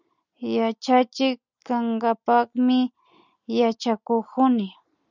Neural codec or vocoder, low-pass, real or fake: none; 7.2 kHz; real